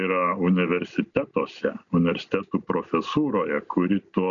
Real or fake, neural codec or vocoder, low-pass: real; none; 7.2 kHz